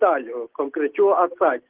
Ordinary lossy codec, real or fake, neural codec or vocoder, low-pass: Opus, 16 kbps; real; none; 3.6 kHz